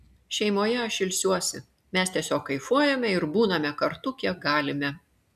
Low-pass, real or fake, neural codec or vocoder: 14.4 kHz; real; none